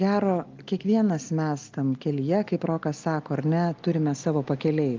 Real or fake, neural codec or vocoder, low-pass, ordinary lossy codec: real; none; 7.2 kHz; Opus, 24 kbps